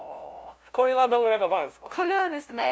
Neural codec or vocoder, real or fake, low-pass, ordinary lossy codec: codec, 16 kHz, 0.5 kbps, FunCodec, trained on LibriTTS, 25 frames a second; fake; none; none